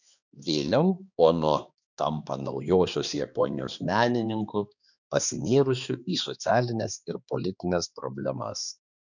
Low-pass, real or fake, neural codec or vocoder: 7.2 kHz; fake; codec, 16 kHz, 2 kbps, X-Codec, HuBERT features, trained on balanced general audio